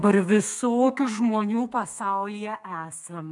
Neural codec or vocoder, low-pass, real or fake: codec, 32 kHz, 1.9 kbps, SNAC; 10.8 kHz; fake